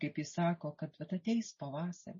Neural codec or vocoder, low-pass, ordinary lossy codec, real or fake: none; 7.2 kHz; MP3, 32 kbps; real